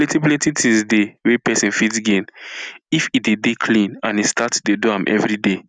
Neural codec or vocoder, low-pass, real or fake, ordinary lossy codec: none; 9.9 kHz; real; none